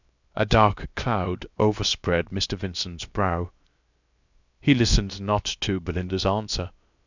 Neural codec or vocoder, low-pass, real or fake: codec, 16 kHz, 0.7 kbps, FocalCodec; 7.2 kHz; fake